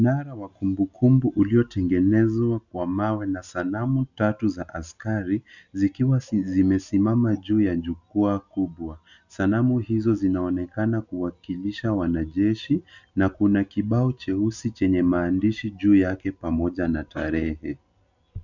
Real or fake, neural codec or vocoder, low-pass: real; none; 7.2 kHz